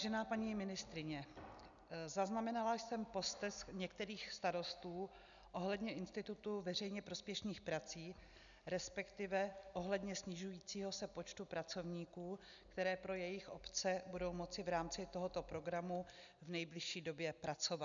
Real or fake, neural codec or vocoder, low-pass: real; none; 7.2 kHz